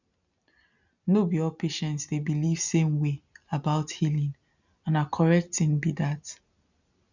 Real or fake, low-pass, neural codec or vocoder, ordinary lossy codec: real; 7.2 kHz; none; none